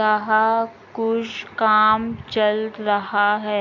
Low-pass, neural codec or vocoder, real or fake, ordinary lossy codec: 7.2 kHz; none; real; none